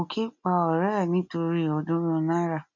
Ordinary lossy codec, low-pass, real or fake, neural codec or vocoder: none; 7.2 kHz; real; none